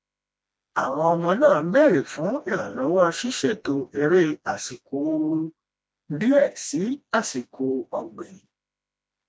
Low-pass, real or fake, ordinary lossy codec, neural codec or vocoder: none; fake; none; codec, 16 kHz, 1 kbps, FreqCodec, smaller model